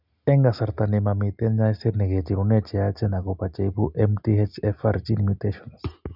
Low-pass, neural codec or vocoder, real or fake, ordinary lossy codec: 5.4 kHz; none; real; none